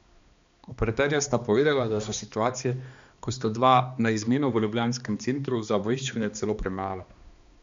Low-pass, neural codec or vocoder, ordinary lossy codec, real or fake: 7.2 kHz; codec, 16 kHz, 2 kbps, X-Codec, HuBERT features, trained on balanced general audio; MP3, 64 kbps; fake